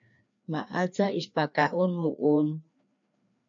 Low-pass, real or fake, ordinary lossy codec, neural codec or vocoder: 7.2 kHz; fake; AAC, 64 kbps; codec, 16 kHz, 2 kbps, FreqCodec, larger model